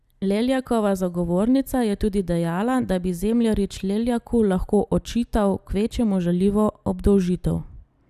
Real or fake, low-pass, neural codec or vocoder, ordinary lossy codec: real; 14.4 kHz; none; none